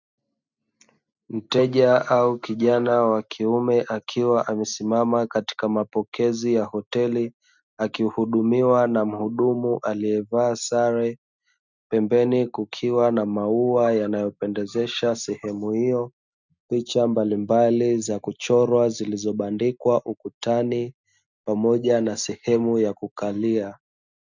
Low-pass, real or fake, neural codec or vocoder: 7.2 kHz; real; none